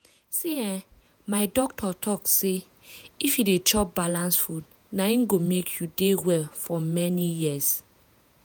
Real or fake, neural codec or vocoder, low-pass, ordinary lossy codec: fake; vocoder, 48 kHz, 128 mel bands, Vocos; none; none